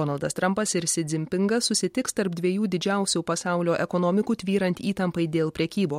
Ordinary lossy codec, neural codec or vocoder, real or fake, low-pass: MP3, 64 kbps; none; real; 19.8 kHz